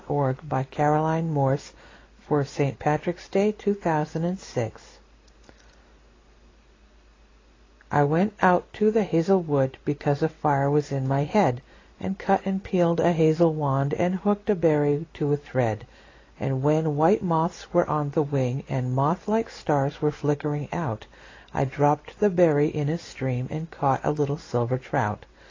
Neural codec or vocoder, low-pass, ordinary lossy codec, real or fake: none; 7.2 kHz; AAC, 32 kbps; real